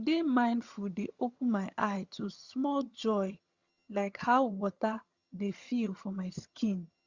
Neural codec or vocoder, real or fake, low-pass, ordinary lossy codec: vocoder, 22.05 kHz, 80 mel bands, HiFi-GAN; fake; 7.2 kHz; Opus, 64 kbps